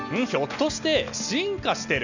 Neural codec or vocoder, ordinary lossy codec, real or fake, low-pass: none; none; real; 7.2 kHz